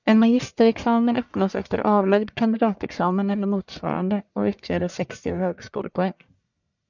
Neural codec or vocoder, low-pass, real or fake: codec, 44.1 kHz, 1.7 kbps, Pupu-Codec; 7.2 kHz; fake